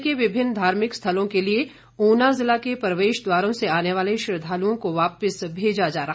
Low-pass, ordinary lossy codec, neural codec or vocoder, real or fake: none; none; none; real